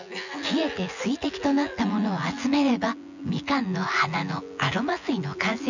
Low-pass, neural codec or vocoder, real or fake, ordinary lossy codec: 7.2 kHz; vocoder, 24 kHz, 100 mel bands, Vocos; fake; none